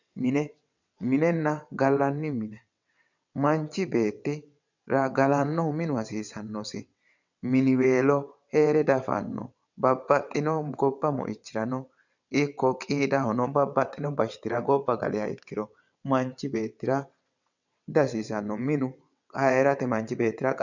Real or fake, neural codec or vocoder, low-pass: fake; vocoder, 22.05 kHz, 80 mel bands, WaveNeXt; 7.2 kHz